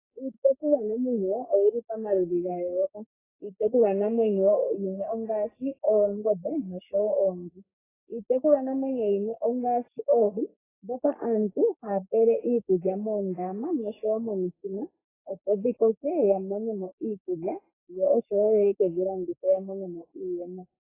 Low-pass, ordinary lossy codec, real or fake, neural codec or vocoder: 3.6 kHz; AAC, 16 kbps; fake; codec, 44.1 kHz, 3.4 kbps, Pupu-Codec